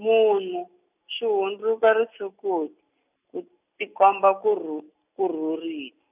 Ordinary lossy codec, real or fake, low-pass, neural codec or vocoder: none; real; 3.6 kHz; none